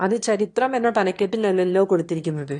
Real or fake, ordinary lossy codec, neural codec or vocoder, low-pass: fake; AAC, 48 kbps; autoencoder, 22.05 kHz, a latent of 192 numbers a frame, VITS, trained on one speaker; 9.9 kHz